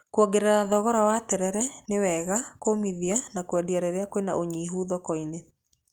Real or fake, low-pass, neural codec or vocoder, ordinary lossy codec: real; 19.8 kHz; none; Opus, 32 kbps